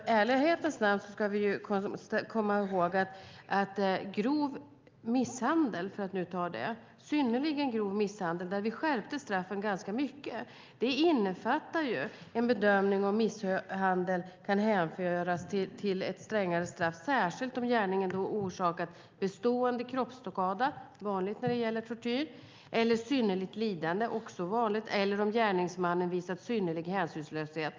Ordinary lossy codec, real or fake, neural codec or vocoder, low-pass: Opus, 24 kbps; real; none; 7.2 kHz